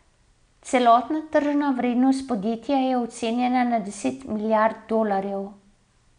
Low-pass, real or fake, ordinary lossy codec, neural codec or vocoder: 9.9 kHz; real; none; none